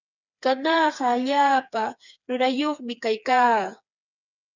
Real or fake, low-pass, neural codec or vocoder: fake; 7.2 kHz; codec, 16 kHz, 4 kbps, FreqCodec, smaller model